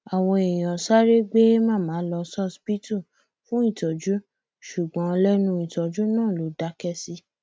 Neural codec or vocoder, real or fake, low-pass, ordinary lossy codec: none; real; none; none